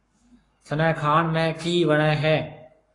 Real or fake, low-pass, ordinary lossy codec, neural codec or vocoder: fake; 10.8 kHz; AAC, 32 kbps; codec, 44.1 kHz, 7.8 kbps, Pupu-Codec